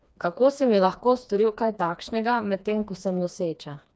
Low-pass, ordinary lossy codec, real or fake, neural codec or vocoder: none; none; fake; codec, 16 kHz, 2 kbps, FreqCodec, smaller model